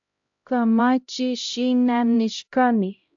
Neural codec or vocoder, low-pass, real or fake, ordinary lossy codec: codec, 16 kHz, 0.5 kbps, X-Codec, HuBERT features, trained on LibriSpeech; 7.2 kHz; fake; AAC, 64 kbps